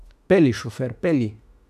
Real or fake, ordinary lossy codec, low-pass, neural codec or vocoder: fake; none; 14.4 kHz; autoencoder, 48 kHz, 32 numbers a frame, DAC-VAE, trained on Japanese speech